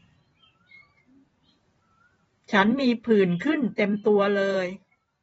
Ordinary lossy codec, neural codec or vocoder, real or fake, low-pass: AAC, 24 kbps; vocoder, 44.1 kHz, 128 mel bands every 512 samples, BigVGAN v2; fake; 19.8 kHz